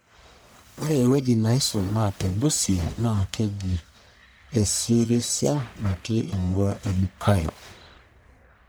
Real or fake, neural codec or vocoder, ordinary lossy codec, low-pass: fake; codec, 44.1 kHz, 1.7 kbps, Pupu-Codec; none; none